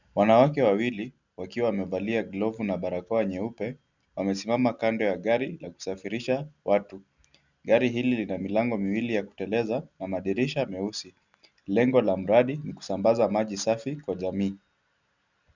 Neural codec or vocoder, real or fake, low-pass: none; real; 7.2 kHz